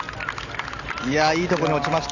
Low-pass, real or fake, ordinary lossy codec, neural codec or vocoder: 7.2 kHz; real; none; none